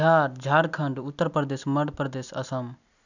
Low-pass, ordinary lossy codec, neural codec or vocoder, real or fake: 7.2 kHz; none; none; real